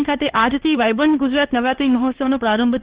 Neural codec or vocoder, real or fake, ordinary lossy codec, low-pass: codec, 16 kHz, 2 kbps, X-Codec, HuBERT features, trained on LibriSpeech; fake; Opus, 16 kbps; 3.6 kHz